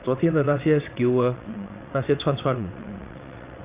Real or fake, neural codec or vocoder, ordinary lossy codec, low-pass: fake; vocoder, 22.05 kHz, 80 mel bands, WaveNeXt; Opus, 64 kbps; 3.6 kHz